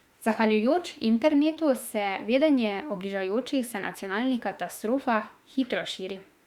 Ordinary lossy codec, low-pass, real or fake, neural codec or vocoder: Opus, 64 kbps; 19.8 kHz; fake; autoencoder, 48 kHz, 32 numbers a frame, DAC-VAE, trained on Japanese speech